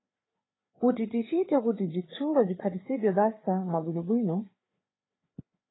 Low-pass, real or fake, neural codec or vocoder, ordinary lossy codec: 7.2 kHz; fake; codec, 16 kHz, 4 kbps, FreqCodec, larger model; AAC, 16 kbps